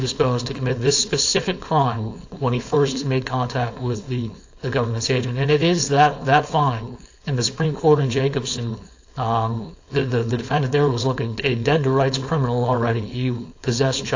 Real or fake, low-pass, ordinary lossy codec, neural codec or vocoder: fake; 7.2 kHz; AAC, 48 kbps; codec, 16 kHz, 4.8 kbps, FACodec